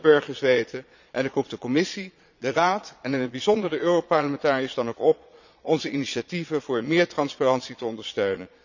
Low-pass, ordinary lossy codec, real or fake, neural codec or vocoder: 7.2 kHz; none; fake; vocoder, 22.05 kHz, 80 mel bands, Vocos